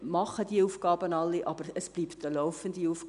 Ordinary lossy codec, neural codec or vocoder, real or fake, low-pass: none; none; real; 10.8 kHz